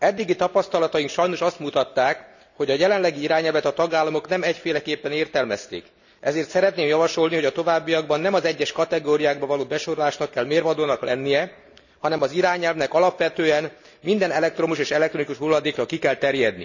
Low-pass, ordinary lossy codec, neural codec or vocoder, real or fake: 7.2 kHz; none; none; real